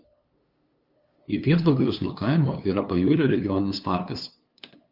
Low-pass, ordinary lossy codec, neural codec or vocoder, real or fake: 5.4 kHz; Opus, 24 kbps; codec, 16 kHz, 2 kbps, FunCodec, trained on LibriTTS, 25 frames a second; fake